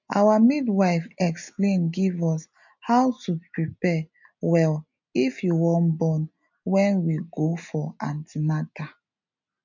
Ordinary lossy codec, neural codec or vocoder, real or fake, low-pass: none; none; real; 7.2 kHz